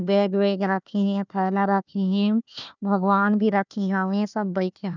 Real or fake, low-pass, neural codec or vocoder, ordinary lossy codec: fake; 7.2 kHz; codec, 16 kHz, 1 kbps, FunCodec, trained on Chinese and English, 50 frames a second; none